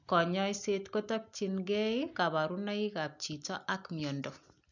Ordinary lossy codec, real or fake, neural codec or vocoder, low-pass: none; real; none; 7.2 kHz